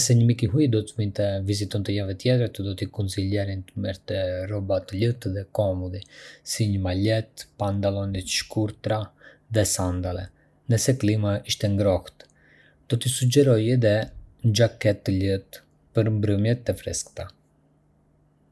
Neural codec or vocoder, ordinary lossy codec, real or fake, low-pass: none; none; real; none